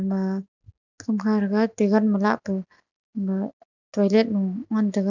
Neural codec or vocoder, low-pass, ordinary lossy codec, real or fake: none; 7.2 kHz; none; real